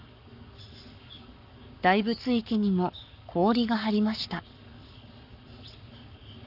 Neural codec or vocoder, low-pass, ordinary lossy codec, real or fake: codec, 44.1 kHz, 7.8 kbps, Pupu-Codec; 5.4 kHz; none; fake